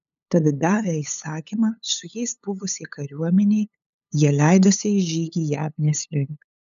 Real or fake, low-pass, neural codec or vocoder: fake; 7.2 kHz; codec, 16 kHz, 8 kbps, FunCodec, trained on LibriTTS, 25 frames a second